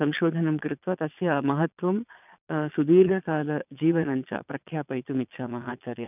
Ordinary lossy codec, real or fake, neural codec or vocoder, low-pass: none; fake; vocoder, 22.05 kHz, 80 mel bands, Vocos; 3.6 kHz